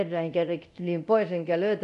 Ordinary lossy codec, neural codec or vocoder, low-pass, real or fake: none; codec, 24 kHz, 0.9 kbps, DualCodec; 10.8 kHz; fake